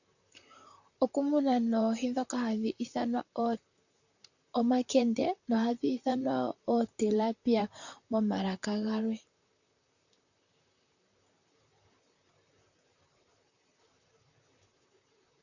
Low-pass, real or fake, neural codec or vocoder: 7.2 kHz; fake; vocoder, 44.1 kHz, 128 mel bands, Pupu-Vocoder